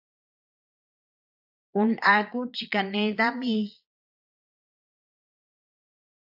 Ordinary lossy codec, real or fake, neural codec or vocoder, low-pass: AAC, 48 kbps; fake; vocoder, 22.05 kHz, 80 mel bands, Vocos; 5.4 kHz